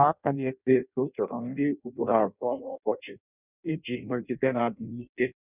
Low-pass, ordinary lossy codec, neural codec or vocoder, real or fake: 3.6 kHz; none; codec, 16 kHz in and 24 kHz out, 0.6 kbps, FireRedTTS-2 codec; fake